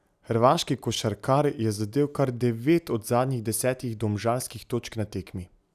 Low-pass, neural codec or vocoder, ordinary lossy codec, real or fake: 14.4 kHz; none; none; real